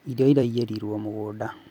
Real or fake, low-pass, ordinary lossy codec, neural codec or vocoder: fake; 19.8 kHz; none; vocoder, 44.1 kHz, 128 mel bands every 256 samples, BigVGAN v2